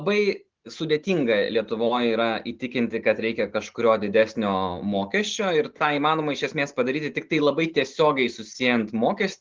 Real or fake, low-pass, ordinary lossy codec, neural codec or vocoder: real; 7.2 kHz; Opus, 16 kbps; none